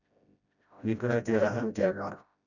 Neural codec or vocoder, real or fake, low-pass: codec, 16 kHz, 0.5 kbps, FreqCodec, smaller model; fake; 7.2 kHz